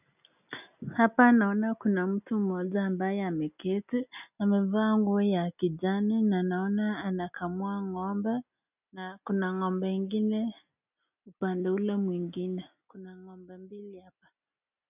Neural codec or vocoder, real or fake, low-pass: none; real; 3.6 kHz